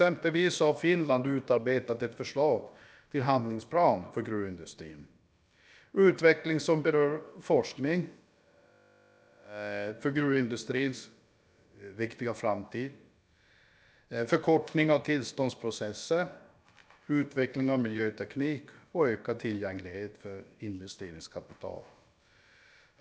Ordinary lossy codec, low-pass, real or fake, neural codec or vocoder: none; none; fake; codec, 16 kHz, about 1 kbps, DyCAST, with the encoder's durations